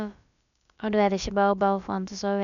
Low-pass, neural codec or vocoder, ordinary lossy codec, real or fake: 7.2 kHz; codec, 16 kHz, about 1 kbps, DyCAST, with the encoder's durations; none; fake